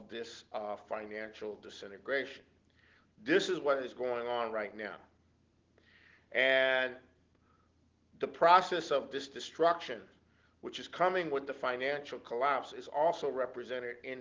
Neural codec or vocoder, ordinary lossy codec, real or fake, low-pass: none; Opus, 16 kbps; real; 7.2 kHz